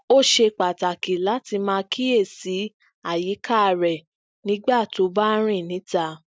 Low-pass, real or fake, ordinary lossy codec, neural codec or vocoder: none; real; none; none